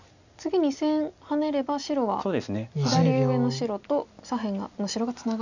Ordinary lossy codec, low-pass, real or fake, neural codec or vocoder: none; 7.2 kHz; real; none